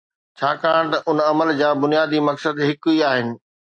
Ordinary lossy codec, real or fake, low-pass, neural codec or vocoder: AAC, 64 kbps; real; 9.9 kHz; none